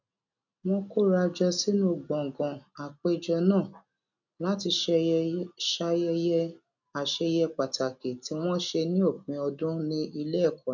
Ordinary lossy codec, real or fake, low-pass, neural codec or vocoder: none; real; 7.2 kHz; none